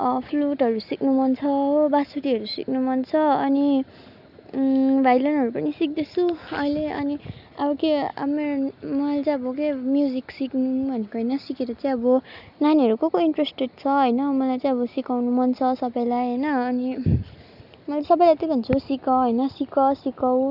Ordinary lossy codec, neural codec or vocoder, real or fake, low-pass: none; none; real; 5.4 kHz